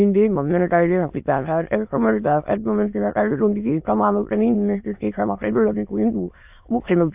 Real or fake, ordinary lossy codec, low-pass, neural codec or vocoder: fake; AAC, 32 kbps; 3.6 kHz; autoencoder, 22.05 kHz, a latent of 192 numbers a frame, VITS, trained on many speakers